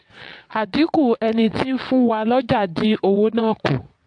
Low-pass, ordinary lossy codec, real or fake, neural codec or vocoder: 9.9 kHz; AAC, 64 kbps; fake; vocoder, 22.05 kHz, 80 mel bands, WaveNeXt